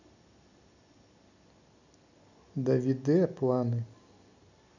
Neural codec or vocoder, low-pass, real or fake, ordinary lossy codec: none; 7.2 kHz; real; none